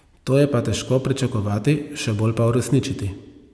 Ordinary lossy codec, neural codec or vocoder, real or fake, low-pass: none; none; real; none